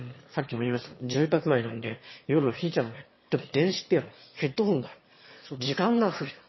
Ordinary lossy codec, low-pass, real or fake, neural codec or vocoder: MP3, 24 kbps; 7.2 kHz; fake; autoencoder, 22.05 kHz, a latent of 192 numbers a frame, VITS, trained on one speaker